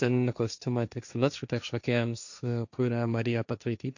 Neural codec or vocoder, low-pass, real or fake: codec, 16 kHz, 1.1 kbps, Voila-Tokenizer; 7.2 kHz; fake